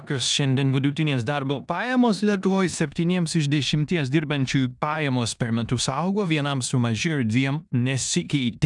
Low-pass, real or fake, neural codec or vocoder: 10.8 kHz; fake; codec, 16 kHz in and 24 kHz out, 0.9 kbps, LongCat-Audio-Codec, four codebook decoder